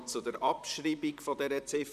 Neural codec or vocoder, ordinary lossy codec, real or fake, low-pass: vocoder, 44.1 kHz, 128 mel bands, Pupu-Vocoder; none; fake; 14.4 kHz